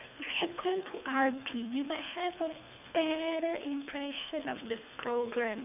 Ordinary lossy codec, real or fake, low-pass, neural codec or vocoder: none; fake; 3.6 kHz; codec, 24 kHz, 3 kbps, HILCodec